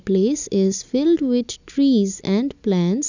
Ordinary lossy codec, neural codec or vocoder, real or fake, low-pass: none; none; real; 7.2 kHz